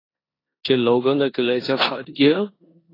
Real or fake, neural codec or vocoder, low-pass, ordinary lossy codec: fake; codec, 16 kHz in and 24 kHz out, 0.9 kbps, LongCat-Audio-Codec, four codebook decoder; 5.4 kHz; AAC, 24 kbps